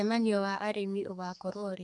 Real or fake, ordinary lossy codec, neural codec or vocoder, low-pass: fake; none; codec, 32 kHz, 1.9 kbps, SNAC; 10.8 kHz